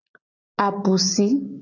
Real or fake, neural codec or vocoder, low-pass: real; none; 7.2 kHz